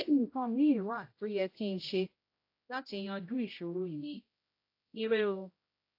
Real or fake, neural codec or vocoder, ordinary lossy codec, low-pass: fake; codec, 16 kHz, 0.5 kbps, X-Codec, HuBERT features, trained on general audio; AAC, 32 kbps; 5.4 kHz